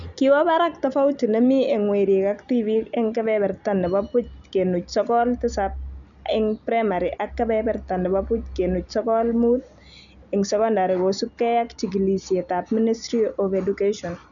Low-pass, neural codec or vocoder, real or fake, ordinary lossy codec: 7.2 kHz; none; real; none